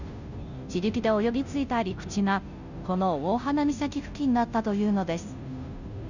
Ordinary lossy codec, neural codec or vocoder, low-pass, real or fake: none; codec, 16 kHz, 0.5 kbps, FunCodec, trained on Chinese and English, 25 frames a second; 7.2 kHz; fake